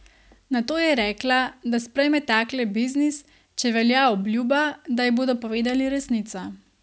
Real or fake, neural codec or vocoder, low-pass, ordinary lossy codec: real; none; none; none